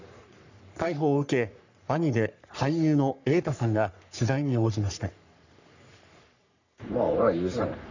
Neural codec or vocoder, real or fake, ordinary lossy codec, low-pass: codec, 44.1 kHz, 3.4 kbps, Pupu-Codec; fake; none; 7.2 kHz